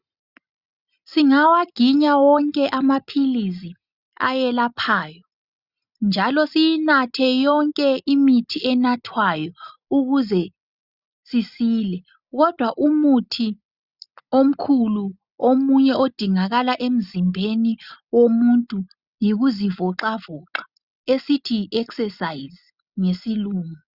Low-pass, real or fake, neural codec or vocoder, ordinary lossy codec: 5.4 kHz; real; none; Opus, 64 kbps